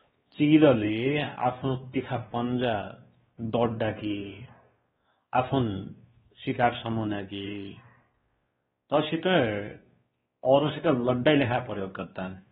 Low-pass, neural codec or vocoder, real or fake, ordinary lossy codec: 7.2 kHz; codec, 16 kHz, 2 kbps, X-Codec, WavLM features, trained on Multilingual LibriSpeech; fake; AAC, 16 kbps